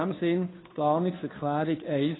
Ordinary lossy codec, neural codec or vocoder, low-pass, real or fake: AAC, 16 kbps; codec, 16 kHz, 2 kbps, FunCodec, trained on Chinese and English, 25 frames a second; 7.2 kHz; fake